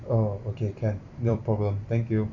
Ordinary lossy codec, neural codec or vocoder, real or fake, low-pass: none; vocoder, 44.1 kHz, 128 mel bands every 256 samples, BigVGAN v2; fake; 7.2 kHz